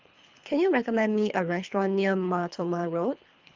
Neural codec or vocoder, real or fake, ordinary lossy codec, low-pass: codec, 24 kHz, 3 kbps, HILCodec; fake; Opus, 32 kbps; 7.2 kHz